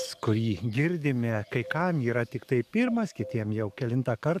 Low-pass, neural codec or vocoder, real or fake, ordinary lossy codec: 14.4 kHz; codec, 44.1 kHz, 7.8 kbps, DAC; fake; AAC, 96 kbps